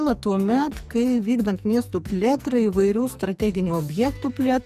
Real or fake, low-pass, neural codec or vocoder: fake; 14.4 kHz; codec, 44.1 kHz, 2.6 kbps, SNAC